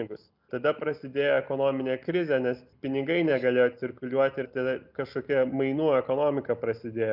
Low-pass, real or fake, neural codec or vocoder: 5.4 kHz; real; none